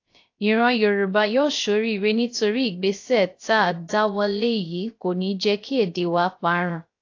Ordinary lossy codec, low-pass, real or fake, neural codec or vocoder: AAC, 48 kbps; 7.2 kHz; fake; codec, 16 kHz, 0.3 kbps, FocalCodec